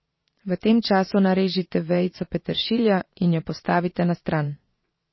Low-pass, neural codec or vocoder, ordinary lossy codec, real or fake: 7.2 kHz; vocoder, 22.05 kHz, 80 mel bands, Vocos; MP3, 24 kbps; fake